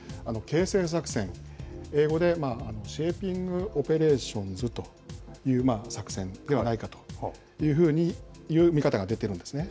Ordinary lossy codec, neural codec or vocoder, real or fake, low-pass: none; none; real; none